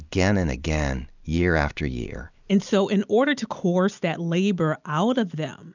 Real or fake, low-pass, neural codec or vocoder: real; 7.2 kHz; none